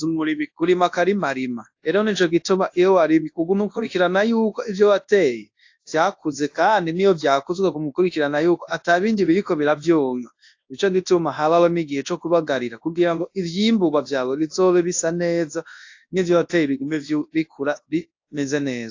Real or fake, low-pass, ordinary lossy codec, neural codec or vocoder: fake; 7.2 kHz; AAC, 48 kbps; codec, 24 kHz, 0.9 kbps, WavTokenizer, large speech release